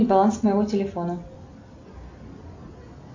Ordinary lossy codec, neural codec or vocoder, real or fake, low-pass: AAC, 48 kbps; none; real; 7.2 kHz